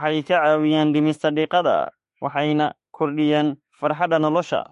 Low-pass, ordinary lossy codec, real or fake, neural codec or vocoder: 14.4 kHz; MP3, 48 kbps; fake; autoencoder, 48 kHz, 32 numbers a frame, DAC-VAE, trained on Japanese speech